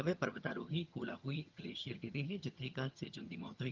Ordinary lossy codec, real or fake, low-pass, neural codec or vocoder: Opus, 24 kbps; fake; 7.2 kHz; vocoder, 22.05 kHz, 80 mel bands, HiFi-GAN